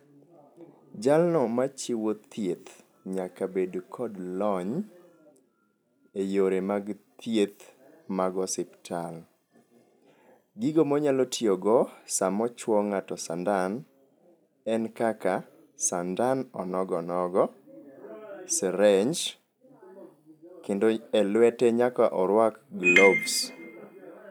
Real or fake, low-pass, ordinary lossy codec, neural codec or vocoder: real; none; none; none